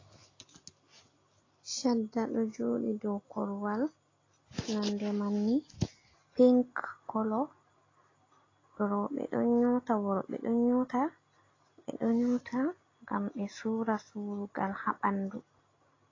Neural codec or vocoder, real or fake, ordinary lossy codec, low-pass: none; real; AAC, 32 kbps; 7.2 kHz